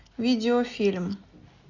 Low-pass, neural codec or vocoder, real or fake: 7.2 kHz; none; real